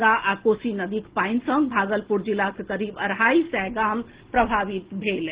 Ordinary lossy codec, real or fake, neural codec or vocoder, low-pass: Opus, 16 kbps; real; none; 3.6 kHz